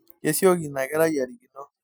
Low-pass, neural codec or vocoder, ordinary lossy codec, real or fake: none; none; none; real